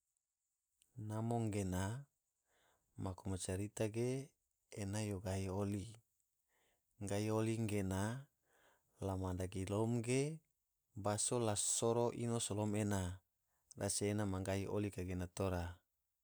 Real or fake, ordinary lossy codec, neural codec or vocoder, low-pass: fake; none; vocoder, 44.1 kHz, 128 mel bands every 256 samples, BigVGAN v2; none